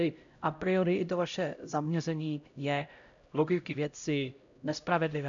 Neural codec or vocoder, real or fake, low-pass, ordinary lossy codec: codec, 16 kHz, 0.5 kbps, X-Codec, HuBERT features, trained on LibriSpeech; fake; 7.2 kHz; AAC, 64 kbps